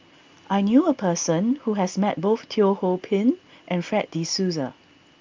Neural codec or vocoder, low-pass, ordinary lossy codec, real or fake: none; 7.2 kHz; Opus, 32 kbps; real